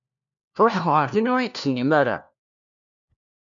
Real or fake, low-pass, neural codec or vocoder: fake; 7.2 kHz; codec, 16 kHz, 1 kbps, FunCodec, trained on LibriTTS, 50 frames a second